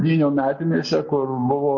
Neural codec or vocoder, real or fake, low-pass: none; real; 7.2 kHz